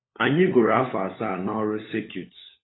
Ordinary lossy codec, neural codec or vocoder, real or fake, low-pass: AAC, 16 kbps; codec, 16 kHz, 16 kbps, FunCodec, trained on LibriTTS, 50 frames a second; fake; 7.2 kHz